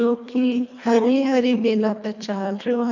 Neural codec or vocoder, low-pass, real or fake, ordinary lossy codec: codec, 24 kHz, 1.5 kbps, HILCodec; 7.2 kHz; fake; none